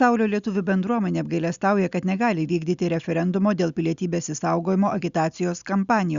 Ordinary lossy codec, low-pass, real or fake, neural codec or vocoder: Opus, 64 kbps; 7.2 kHz; real; none